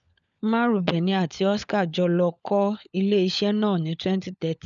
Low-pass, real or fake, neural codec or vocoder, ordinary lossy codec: 7.2 kHz; fake; codec, 16 kHz, 16 kbps, FunCodec, trained on LibriTTS, 50 frames a second; none